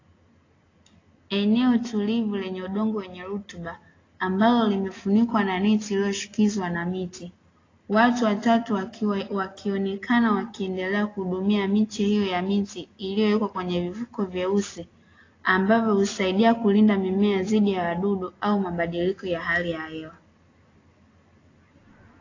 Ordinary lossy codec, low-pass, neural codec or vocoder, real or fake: AAC, 32 kbps; 7.2 kHz; none; real